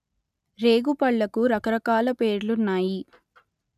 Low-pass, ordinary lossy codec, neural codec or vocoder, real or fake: 14.4 kHz; none; none; real